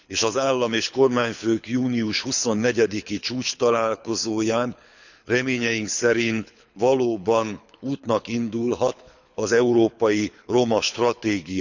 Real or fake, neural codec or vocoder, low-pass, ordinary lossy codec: fake; codec, 24 kHz, 6 kbps, HILCodec; 7.2 kHz; none